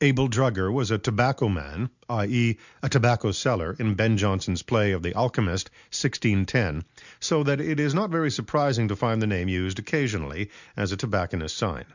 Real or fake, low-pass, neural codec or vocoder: real; 7.2 kHz; none